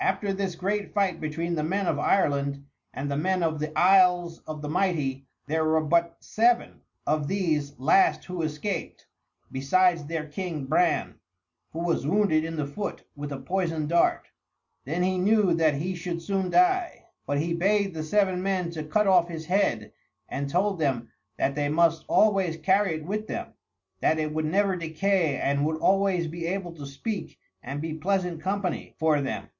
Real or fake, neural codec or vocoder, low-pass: real; none; 7.2 kHz